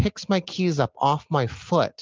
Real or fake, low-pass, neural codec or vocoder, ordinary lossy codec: real; 7.2 kHz; none; Opus, 24 kbps